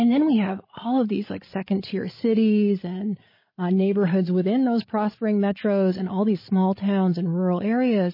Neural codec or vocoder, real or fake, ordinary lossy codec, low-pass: codec, 16 kHz, 16 kbps, FreqCodec, larger model; fake; MP3, 24 kbps; 5.4 kHz